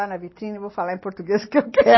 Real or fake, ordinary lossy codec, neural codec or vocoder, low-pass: real; MP3, 24 kbps; none; 7.2 kHz